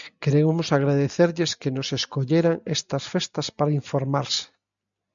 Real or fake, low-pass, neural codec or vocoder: real; 7.2 kHz; none